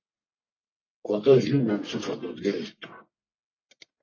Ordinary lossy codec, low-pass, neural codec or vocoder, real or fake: MP3, 32 kbps; 7.2 kHz; codec, 44.1 kHz, 1.7 kbps, Pupu-Codec; fake